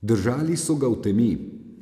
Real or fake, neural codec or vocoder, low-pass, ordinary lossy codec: real; none; 14.4 kHz; AAC, 96 kbps